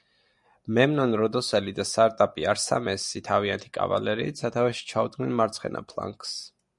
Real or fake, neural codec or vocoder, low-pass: real; none; 10.8 kHz